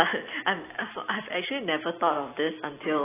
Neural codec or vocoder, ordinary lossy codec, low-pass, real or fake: none; AAC, 16 kbps; 3.6 kHz; real